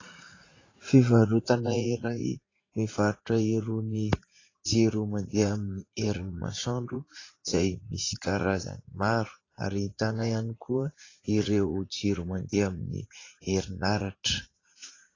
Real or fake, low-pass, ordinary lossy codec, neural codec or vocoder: fake; 7.2 kHz; AAC, 32 kbps; vocoder, 44.1 kHz, 80 mel bands, Vocos